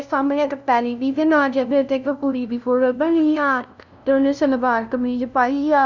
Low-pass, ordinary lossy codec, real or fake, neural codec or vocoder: 7.2 kHz; none; fake; codec, 16 kHz, 0.5 kbps, FunCodec, trained on LibriTTS, 25 frames a second